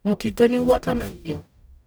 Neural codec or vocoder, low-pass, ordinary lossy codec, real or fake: codec, 44.1 kHz, 0.9 kbps, DAC; none; none; fake